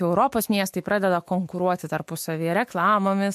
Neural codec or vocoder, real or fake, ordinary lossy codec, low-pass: autoencoder, 48 kHz, 128 numbers a frame, DAC-VAE, trained on Japanese speech; fake; MP3, 64 kbps; 14.4 kHz